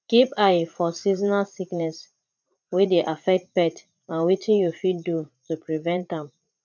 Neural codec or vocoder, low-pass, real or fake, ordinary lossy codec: vocoder, 44.1 kHz, 80 mel bands, Vocos; 7.2 kHz; fake; none